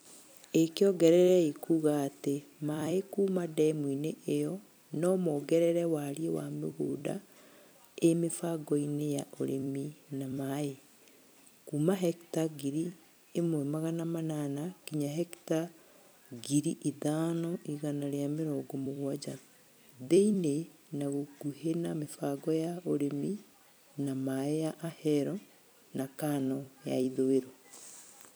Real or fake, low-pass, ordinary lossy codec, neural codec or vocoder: fake; none; none; vocoder, 44.1 kHz, 128 mel bands every 256 samples, BigVGAN v2